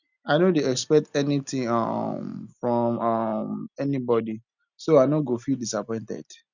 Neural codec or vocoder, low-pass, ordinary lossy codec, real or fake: none; 7.2 kHz; none; real